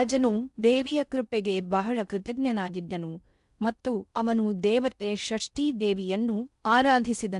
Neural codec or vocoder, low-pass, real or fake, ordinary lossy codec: codec, 16 kHz in and 24 kHz out, 0.6 kbps, FocalCodec, streaming, 4096 codes; 10.8 kHz; fake; none